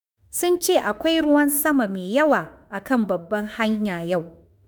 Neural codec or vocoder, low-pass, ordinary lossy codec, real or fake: autoencoder, 48 kHz, 32 numbers a frame, DAC-VAE, trained on Japanese speech; none; none; fake